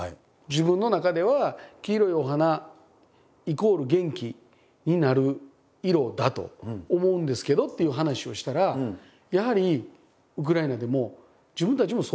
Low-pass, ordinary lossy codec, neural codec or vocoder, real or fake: none; none; none; real